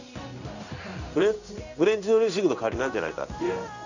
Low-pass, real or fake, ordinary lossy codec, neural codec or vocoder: 7.2 kHz; fake; none; codec, 16 kHz in and 24 kHz out, 1 kbps, XY-Tokenizer